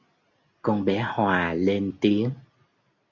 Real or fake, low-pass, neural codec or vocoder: real; 7.2 kHz; none